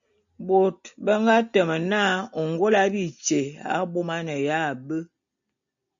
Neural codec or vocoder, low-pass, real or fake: none; 7.2 kHz; real